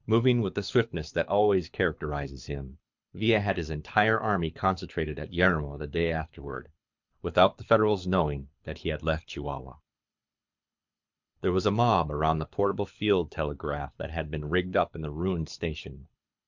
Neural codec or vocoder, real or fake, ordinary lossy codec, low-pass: codec, 24 kHz, 6 kbps, HILCodec; fake; MP3, 64 kbps; 7.2 kHz